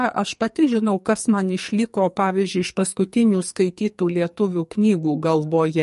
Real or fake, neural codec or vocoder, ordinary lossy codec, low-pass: fake; codec, 44.1 kHz, 2.6 kbps, SNAC; MP3, 48 kbps; 14.4 kHz